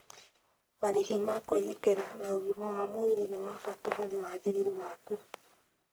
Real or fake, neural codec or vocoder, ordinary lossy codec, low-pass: fake; codec, 44.1 kHz, 1.7 kbps, Pupu-Codec; none; none